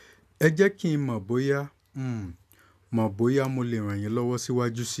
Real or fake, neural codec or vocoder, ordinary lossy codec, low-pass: real; none; none; 14.4 kHz